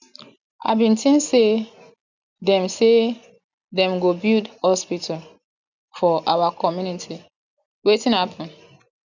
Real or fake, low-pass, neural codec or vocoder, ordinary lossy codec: real; 7.2 kHz; none; none